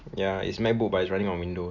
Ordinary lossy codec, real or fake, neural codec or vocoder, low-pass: none; real; none; 7.2 kHz